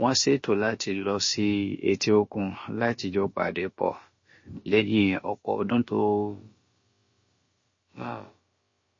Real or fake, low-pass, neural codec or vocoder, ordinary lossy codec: fake; 7.2 kHz; codec, 16 kHz, about 1 kbps, DyCAST, with the encoder's durations; MP3, 32 kbps